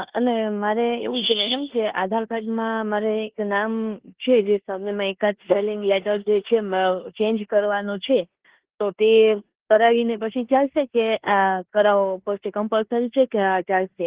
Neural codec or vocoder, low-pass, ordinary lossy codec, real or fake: codec, 16 kHz in and 24 kHz out, 0.9 kbps, LongCat-Audio-Codec, four codebook decoder; 3.6 kHz; Opus, 16 kbps; fake